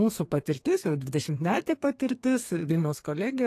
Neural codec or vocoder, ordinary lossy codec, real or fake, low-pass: codec, 32 kHz, 1.9 kbps, SNAC; AAC, 48 kbps; fake; 14.4 kHz